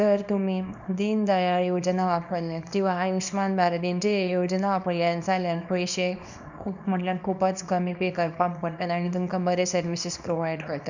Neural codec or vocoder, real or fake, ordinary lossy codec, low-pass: codec, 24 kHz, 0.9 kbps, WavTokenizer, small release; fake; none; 7.2 kHz